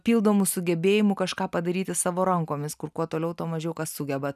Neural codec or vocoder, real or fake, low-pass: none; real; 14.4 kHz